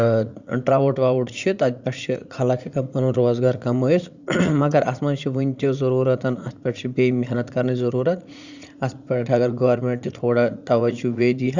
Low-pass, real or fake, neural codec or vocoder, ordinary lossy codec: 7.2 kHz; fake; vocoder, 44.1 kHz, 80 mel bands, Vocos; Opus, 64 kbps